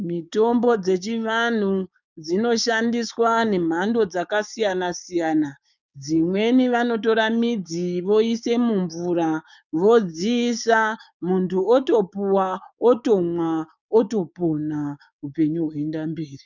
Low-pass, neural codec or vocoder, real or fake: 7.2 kHz; codec, 16 kHz, 6 kbps, DAC; fake